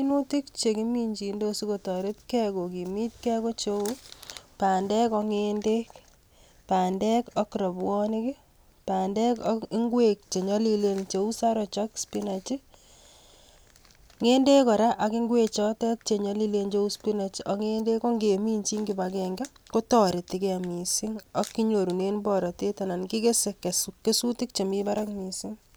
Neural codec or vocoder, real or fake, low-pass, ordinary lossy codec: none; real; none; none